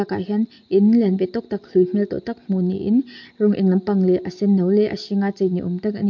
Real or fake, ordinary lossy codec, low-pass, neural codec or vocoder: real; MP3, 48 kbps; 7.2 kHz; none